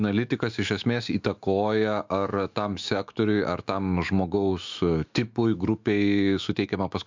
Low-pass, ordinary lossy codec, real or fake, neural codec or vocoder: 7.2 kHz; MP3, 64 kbps; fake; autoencoder, 48 kHz, 128 numbers a frame, DAC-VAE, trained on Japanese speech